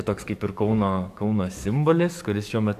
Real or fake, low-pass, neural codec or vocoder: fake; 14.4 kHz; codec, 44.1 kHz, 7.8 kbps, DAC